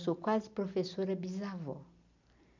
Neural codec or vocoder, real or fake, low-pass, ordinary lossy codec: none; real; 7.2 kHz; none